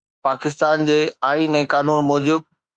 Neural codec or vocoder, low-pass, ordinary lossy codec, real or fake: autoencoder, 48 kHz, 32 numbers a frame, DAC-VAE, trained on Japanese speech; 9.9 kHz; Opus, 64 kbps; fake